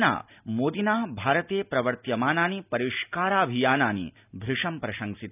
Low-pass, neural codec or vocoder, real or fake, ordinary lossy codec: 3.6 kHz; none; real; none